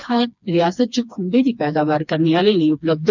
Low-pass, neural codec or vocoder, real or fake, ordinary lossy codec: 7.2 kHz; codec, 16 kHz, 2 kbps, FreqCodec, smaller model; fake; none